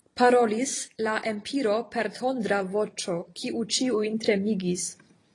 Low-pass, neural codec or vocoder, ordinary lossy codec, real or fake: 10.8 kHz; vocoder, 44.1 kHz, 128 mel bands every 256 samples, BigVGAN v2; AAC, 32 kbps; fake